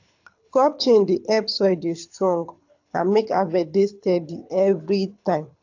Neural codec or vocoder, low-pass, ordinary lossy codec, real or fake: codec, 24 kHz, 6 kbps, HILCodec; 7.2 kHz; AAC, 48 kbps; fake